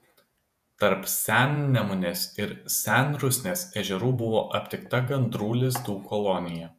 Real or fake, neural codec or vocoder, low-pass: fake; vocoder, 48 kHz, 128 mel bands, Vocos; 14.4 kHz